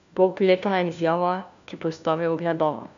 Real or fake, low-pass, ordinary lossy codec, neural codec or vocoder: fake; 7.2 kHz; AAC, 96 kbps; codec, 16 kHz, 1 kbps, FunCodec, trained on LibriTTS, 50 frames a second